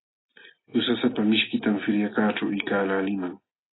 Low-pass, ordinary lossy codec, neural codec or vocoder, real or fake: 7.2 kHz; AAC, 16 kbps; none; real